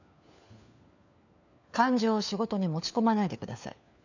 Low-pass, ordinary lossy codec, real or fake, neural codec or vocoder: 7.2 kHz; none; fake; codec, 16 kHz, 4 kbps, FunCodec, trained on LibriTTS, 50 frames a second